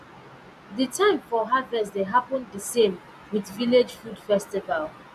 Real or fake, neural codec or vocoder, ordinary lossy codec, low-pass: real; none; none; 14.4 kHz